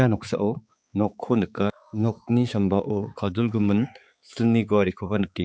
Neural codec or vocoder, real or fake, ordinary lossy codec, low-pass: codec, 16 kHz, 4 kbps, X-Codec, HuBERT features, trained on balanced general audio; fake; none; none